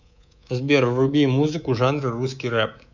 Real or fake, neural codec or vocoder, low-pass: fake; codec, 24 kHz, 3.1 kbps, DualCodec; 7.2 kHz